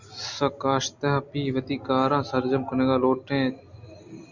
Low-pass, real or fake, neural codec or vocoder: 7.2 kHz; real; none